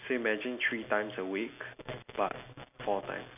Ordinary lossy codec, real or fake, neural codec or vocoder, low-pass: none; real; none; 3.6 kHz